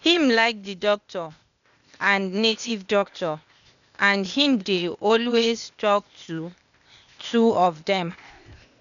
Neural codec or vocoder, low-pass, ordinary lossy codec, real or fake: codec, 16 kHz, 0.8 kbps, ZipCodec; 7.2 kHz; none; fake